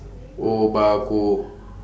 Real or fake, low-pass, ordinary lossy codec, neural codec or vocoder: real; none; none; none